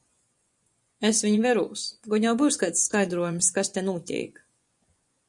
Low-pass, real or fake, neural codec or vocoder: 10.8 kHz; fake; vocoder, 24 kHz, 100 mel bands, Vocos